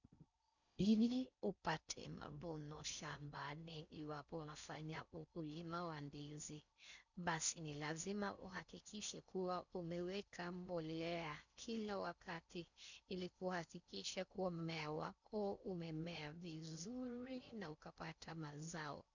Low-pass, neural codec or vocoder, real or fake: 7.2 kHz; codec, 16 kHz in and 24 kHz out, 0.6 kbps, FocalCodec, streaming, 4096 codes; fake